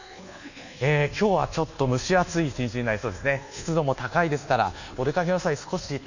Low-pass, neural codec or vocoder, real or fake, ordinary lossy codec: 7.2 kHz; codec, 24 kHz, 1.2 kbps, DualCodec; fake; none